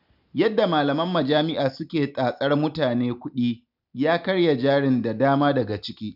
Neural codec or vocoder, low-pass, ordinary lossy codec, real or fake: none; 5.4 kHz; none; real